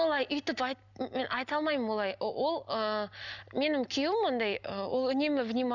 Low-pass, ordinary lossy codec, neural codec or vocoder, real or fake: 7.2 kHz; none; none; real